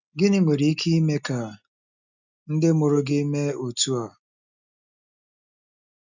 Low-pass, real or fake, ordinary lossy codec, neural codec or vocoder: 7.2 kHz; real; none; none